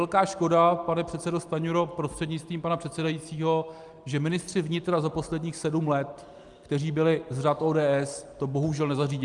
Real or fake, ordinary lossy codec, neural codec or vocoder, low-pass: real; Opus, 32 kbps; none; 10.8 kHz